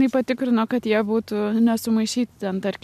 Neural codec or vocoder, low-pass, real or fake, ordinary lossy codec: none; 14.4 kHz; real; MP3, 96 kbps